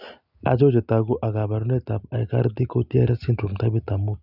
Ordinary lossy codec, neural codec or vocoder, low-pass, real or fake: none; none; 5.4 kHz; real